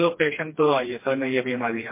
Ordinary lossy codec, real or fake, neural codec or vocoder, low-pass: MP3, 24 kbps; fake; codec, 16 kHz, 2 kbps, FreqCodec, smaller model; 3.6 kHz